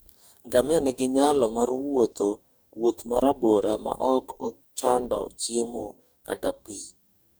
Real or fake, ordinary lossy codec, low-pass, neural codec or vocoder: fake; none; none; codec, 44.1 kHz, 2.6 kbps, DAC